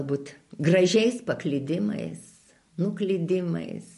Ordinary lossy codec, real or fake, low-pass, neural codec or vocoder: MP3, 48 kbps; real; 14.4 kHz; none